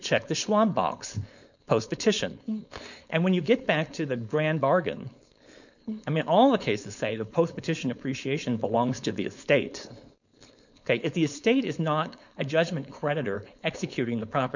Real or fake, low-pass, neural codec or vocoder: fake; 7.2 kHz; codec, 16 kHz, 4.8 kbps, FACodec